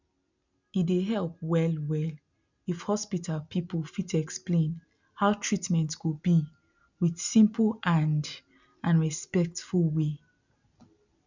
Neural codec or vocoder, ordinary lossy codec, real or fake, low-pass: none; none; real; 7.2 kHz